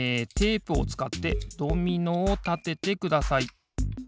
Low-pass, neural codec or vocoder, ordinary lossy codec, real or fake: none; none; none; real